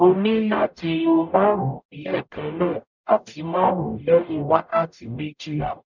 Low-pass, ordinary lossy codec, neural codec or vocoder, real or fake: 7.2 kHz; none; codec, 44.1 kHz, 0.9 kbps, DAC; fake